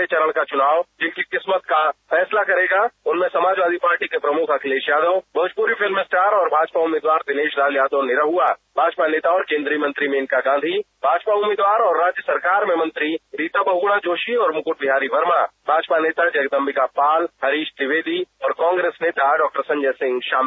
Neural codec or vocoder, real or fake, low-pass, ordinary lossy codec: none; real; none; none